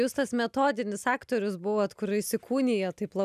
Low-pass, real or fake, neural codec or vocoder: 14.4 kHz; real; none